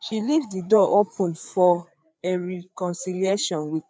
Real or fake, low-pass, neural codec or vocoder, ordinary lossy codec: fake; none; codec, 16 kHz, 4 kbps, FreqCodec, larger model; none